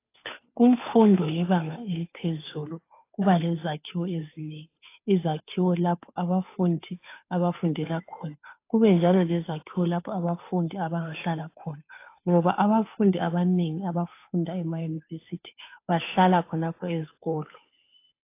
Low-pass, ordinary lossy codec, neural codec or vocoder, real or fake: 3.6 kHz; AAC, 24 kbps; codec, 16 kHz, 2 kbps, FunCodec, trained on Chinese and English, 25 frames a second; fake